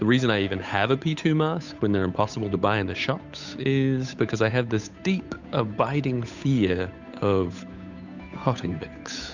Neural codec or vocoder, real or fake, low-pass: codec, 16 kHz, 8 kbps, FunCodec, trained on Chinese and English, 25 frames a second; fake; 7.2 kHz